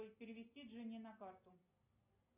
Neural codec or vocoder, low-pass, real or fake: none; 3.6 kHz; real